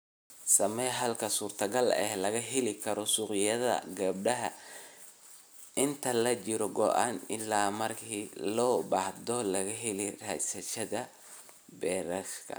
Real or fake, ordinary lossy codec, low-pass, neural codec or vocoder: real; none; none; none